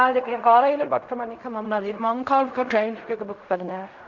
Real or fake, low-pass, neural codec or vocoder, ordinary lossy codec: fake; 7.2 kHz; codec, 16 kHz in and 24 kHz out, 0.4 kbps, LongCat-Audio-Codec, fine tuned four codebook decoder; none